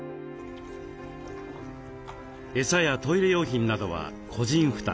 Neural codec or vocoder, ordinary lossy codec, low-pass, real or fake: none; none; none; real